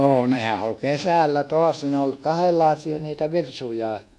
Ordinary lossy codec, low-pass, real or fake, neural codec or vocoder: none; none; fake; codec, 24 kHz, 1.2 kbps, DualCodec